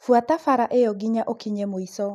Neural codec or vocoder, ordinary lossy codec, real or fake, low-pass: none; none; real; 14.4 kHz